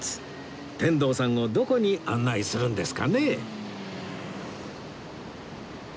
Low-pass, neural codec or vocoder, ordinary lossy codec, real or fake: none; none; none; real